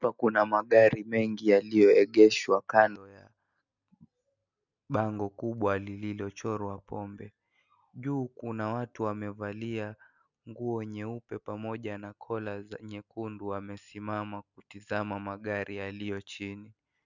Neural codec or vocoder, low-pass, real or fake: none; 7.2 kHz; real